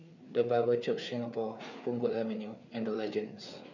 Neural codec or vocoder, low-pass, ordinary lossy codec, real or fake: codec, 16 kHz, 4 kbps, FreqCodec, larger model; 7.2 kHz; none; fake